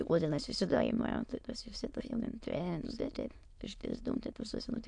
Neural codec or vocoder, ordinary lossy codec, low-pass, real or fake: autoencoder, 22.05 kHz, a latent of 192 numbers a frame, VITS, trained on many speakers; MP3, 64 kbps; 9.9 kHz; fake